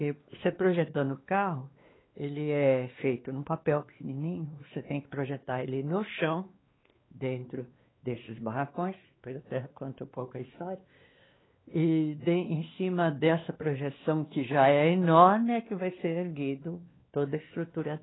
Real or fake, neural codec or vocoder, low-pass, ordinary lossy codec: fake; codec, 16 kHz, 2 kbps, X-Codec, WavLM features, trained on Multilingual LibriSpeech; 7.2 kHz; AAC, 16 kbps